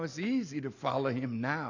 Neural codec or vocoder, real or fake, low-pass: none; real; 7.2 kHz